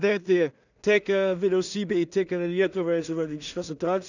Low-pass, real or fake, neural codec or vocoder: 7.2 kHz; fake; codec, 16 kHz in and 24 kHz out, 0.4 kbps, LongCat-Audio-Codec, two codebook decoder